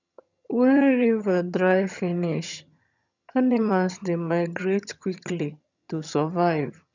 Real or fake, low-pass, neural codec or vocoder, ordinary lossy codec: fake; 7.2 kHz; vocoder, 22.05 kHz, 80 mel bands, HiFi-GAN; none